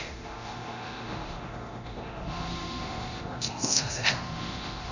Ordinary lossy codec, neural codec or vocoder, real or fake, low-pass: none; codec, 24 kHz, 0.9 kbps, DualCodec; fake; 7.2 kHz